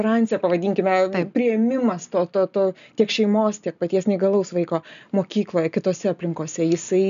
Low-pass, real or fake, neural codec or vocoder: 7.2 kHz; real; none